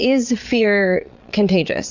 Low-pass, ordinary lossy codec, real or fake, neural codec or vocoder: 7.2 kHz; Opus, 64 kbps; fake; vocoder, 22.05 kHz, 80 mel bands, Vocos